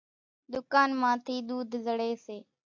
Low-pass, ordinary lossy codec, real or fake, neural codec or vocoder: 7.2 kHz; AAC, 48 kbps; real; none